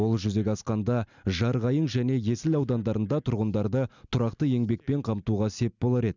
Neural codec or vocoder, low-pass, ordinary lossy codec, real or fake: none; 7.2 kHz; none; real